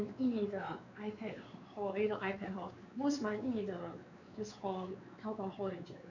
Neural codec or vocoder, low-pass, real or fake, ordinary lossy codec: codec, 16 kHz, 4 kbps, X-Codec, WavLM features, trained on Multilingual LibriSpeech; 7.2 kHz; fake; none